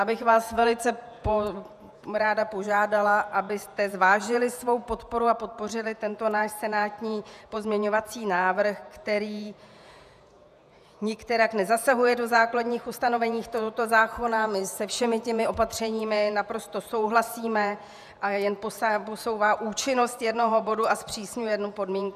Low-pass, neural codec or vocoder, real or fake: 14.4 kHz; vocoder, 48 kHz, 128 mel bands, Vocos; fake